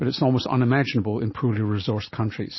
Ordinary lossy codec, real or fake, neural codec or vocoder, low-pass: MP3, 24 kbps; real; none; 7.2 kHz